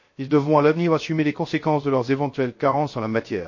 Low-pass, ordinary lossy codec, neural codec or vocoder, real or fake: 7.2 kHz; MP3, 32 kbps; codec, 16 kHz, 0.3 kbps, FocalCodec; fake